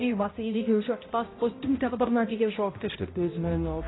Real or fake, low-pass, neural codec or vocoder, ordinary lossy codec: fake; 7.2 kHz; codec, 16 kHz, 0.5 kbps, X-Codec, HuBERT features, trained on balanced general audio; AAC, 16 kbps